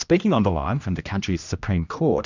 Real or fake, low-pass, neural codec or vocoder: fake; 7.2 kHz; codec, 16 kHz, 1 kbps, X-Codec, HuBERT features, trained on general audio